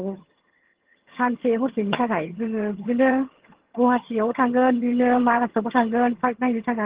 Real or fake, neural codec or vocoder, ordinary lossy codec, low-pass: fake; vocoder, 22.05 kHz, 80 mel bands, HiFi-GAN; Opus, 16 kbps; 3.6 kHz